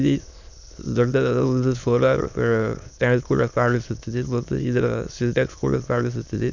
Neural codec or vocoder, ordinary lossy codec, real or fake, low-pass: autoencoder, 22.05 kHz, a latent of 192 numbers a frame, VITS, trained on many speakers; none; fake; 7.2 kHz